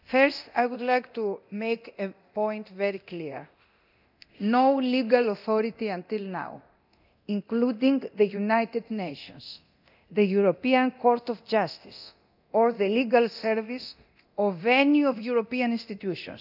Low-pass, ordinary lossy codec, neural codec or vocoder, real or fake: 5.4 kHz; none; codec, 24 kHz, 0.9 kbps, DualCodec; fake